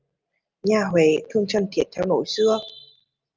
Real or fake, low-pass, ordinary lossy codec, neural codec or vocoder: real; 7.2 kHz; Opus, 24 kbps; none